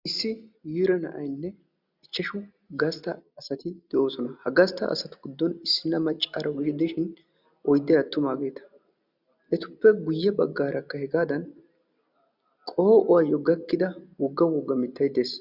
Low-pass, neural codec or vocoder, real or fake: 5.4 kHz; none; real